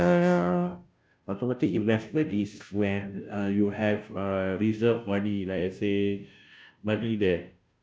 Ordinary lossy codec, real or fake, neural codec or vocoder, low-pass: none; fake; codec, 16 kHz, 0.5 kbps, FunCodec, trained on Chinese and English, 25 frames a second; none